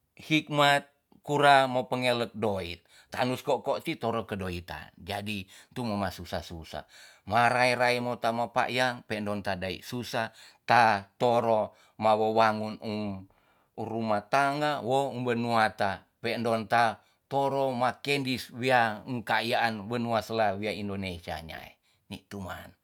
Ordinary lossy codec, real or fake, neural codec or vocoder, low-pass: none; real; none; 19.8 kHz